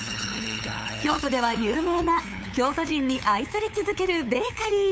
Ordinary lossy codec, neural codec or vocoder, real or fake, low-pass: none; codec, 16 kHz, 8 kbps, FunCodec, trained on LibriTTS, 25 frames a second; fake; none